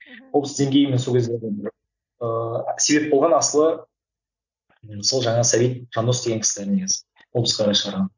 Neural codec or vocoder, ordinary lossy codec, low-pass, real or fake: none; none; 7.2 kHz; real